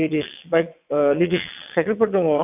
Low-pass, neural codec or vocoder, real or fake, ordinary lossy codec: 3.6 kHz; vocoder, 22.05 kHz, 80 mel bands, WaveNeXt; fake; none